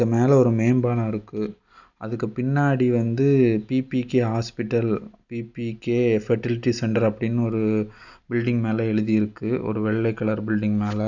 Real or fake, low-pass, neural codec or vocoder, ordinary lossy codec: real; 7.2 kHz; none; none